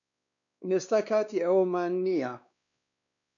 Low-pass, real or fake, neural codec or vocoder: 7.2 kHz; fake; codec, 16 kHz, 2 kbps, X-Codec, WavLM features, trained on Multilingual LibriSpeech